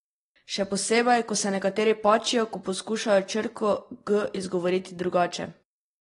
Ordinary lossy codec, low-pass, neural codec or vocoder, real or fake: AAC, 32 kbps; 10.8 kHz; none; real